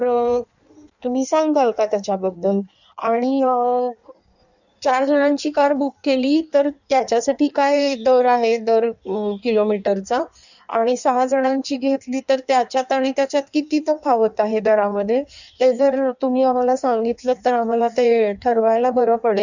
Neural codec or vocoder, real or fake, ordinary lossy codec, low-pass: codec, 16 kHz in and 24 kHz out, 1.1 kbps, FireRedTTS-2 codec; fake; none; 7.2 kHz